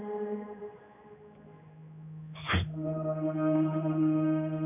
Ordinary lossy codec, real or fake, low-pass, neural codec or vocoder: none; fake; 3.6 kHz; codec, 16 kHz, 2 kbps, X-Codec, HuBERT features, trained on general audio